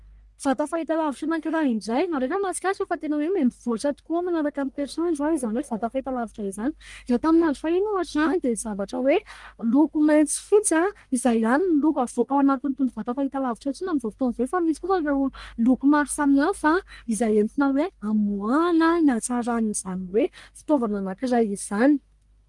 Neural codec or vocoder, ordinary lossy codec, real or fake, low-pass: codec, 44.1 kHz, 1.7 kbps, Pupu-Codec; Opus, 32 kbps; fake; 10.8 kHz